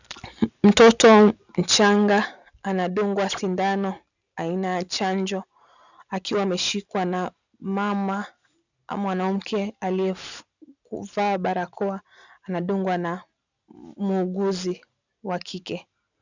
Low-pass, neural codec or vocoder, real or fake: 7.2 kHz; none; real